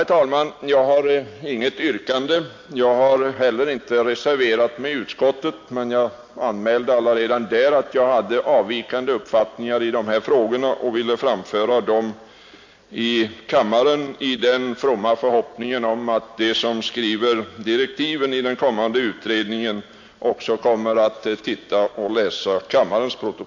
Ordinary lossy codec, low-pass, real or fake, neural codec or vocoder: MP3, 48 kbps; 7.2 kHz; real; none